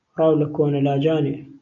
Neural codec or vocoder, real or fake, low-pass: none; real; 7.2 kHz